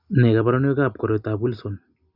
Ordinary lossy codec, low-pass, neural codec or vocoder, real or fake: none; 5.4 kHz; none; real